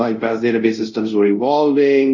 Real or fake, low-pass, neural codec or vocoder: fake; 7.2 kHz; codec, 24 kHz, 0.5 kbps, DualCodec